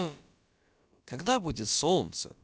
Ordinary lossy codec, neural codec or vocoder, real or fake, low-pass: none; codec, 16 kHz, about 1 kbps, DyCAST, with the encoder's durations; fake; none